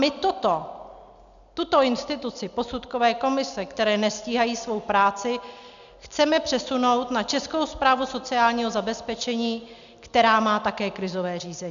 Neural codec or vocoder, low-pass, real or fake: none; 7.2 kHz; real